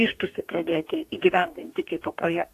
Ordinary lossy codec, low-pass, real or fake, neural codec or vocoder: AAC, 64 kbps; 14.4 kHz; fake; codec, 44.1 kHz, 2.6 kbps, DAC